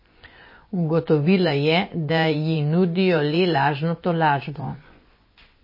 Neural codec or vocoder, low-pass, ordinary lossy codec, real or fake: vocoder, 24 kHz, 100 mel bands, Vocos; 5.4 kHz; MP3, 24 kbps; fake